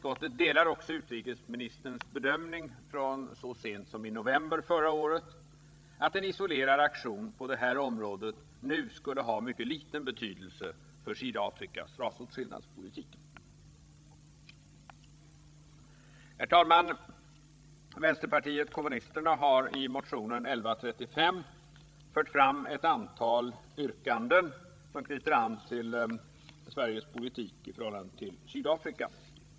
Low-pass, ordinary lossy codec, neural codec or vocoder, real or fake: none; none; codec, 16 kHz, 16 kbps, FreqCodec, larger model; fake